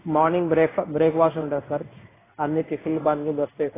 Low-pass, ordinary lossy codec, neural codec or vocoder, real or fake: 3.6 kHz; AAC, 16 kbps; codec, 16 kHz in and 24 kHz out, 1 kbps, XY-Tokenizer; fake